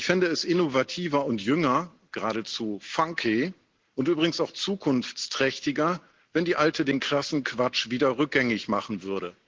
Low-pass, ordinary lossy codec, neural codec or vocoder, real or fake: 7.2 kHz; Opus, 16 kbps; none; real